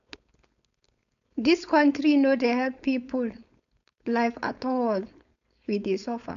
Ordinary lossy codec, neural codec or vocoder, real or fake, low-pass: none; codec, 16 kHz, 4.8 kbps, FACodec; fake; 7.2 kHz